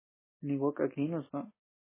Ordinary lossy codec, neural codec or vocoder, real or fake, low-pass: MP3, 16 kbps; none; real; 3.6 kHz